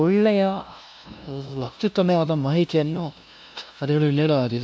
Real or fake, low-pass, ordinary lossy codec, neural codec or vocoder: fake; none; none; codec, 16 kHz, 0.5 kbps, FunCodec, trained on LibriTTS, 25 frames a second